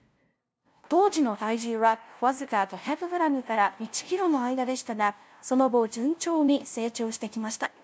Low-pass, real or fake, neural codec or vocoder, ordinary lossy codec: none; fake; codec, 16 kHz, 0.5 kbps, FunCodec, trained on LibriTTS, 25 frames a second; none